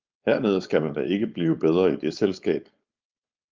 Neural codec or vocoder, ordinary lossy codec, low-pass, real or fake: codec, 16 kHz, 4.8 kbps, FACodec; Opus, 24 kbps; 7.2 kHz; fake